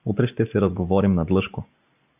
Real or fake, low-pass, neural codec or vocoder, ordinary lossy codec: real; 3.6 kHz; none; AAC, 32 kbps